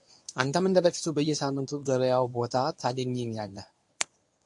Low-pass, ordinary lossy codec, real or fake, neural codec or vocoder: 10.8 kHz; AAC, 64 kbps; fake; codec, 24 kHz, 0.9 kbps, WavTokenizer, medium speech release version 1